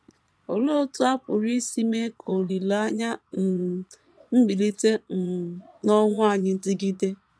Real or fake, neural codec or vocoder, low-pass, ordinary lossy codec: fake; vocoder, 22.05 kHz, 80 mel bands, Vocos; none; none